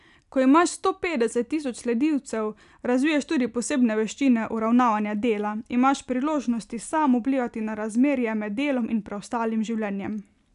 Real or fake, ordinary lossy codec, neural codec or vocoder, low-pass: real; none; none; 10.8 kHz